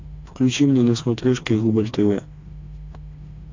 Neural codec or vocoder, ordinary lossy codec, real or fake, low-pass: codec, 16 kHz, 2 kbps, FreqCodec, smaller model; AAC, 48 kbps; fake; 7.2 kHz